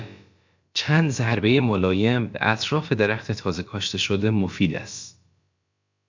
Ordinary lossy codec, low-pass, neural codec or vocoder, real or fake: MP3, 64 kbps; 7.2 kHz; codec, 16 kHz, about 1 kbps, DyCAST, with the encoder's durations; fake